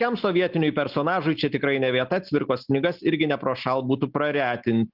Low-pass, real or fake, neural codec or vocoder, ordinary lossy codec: 5.4 kHz; real; none; Opus, 24 kbps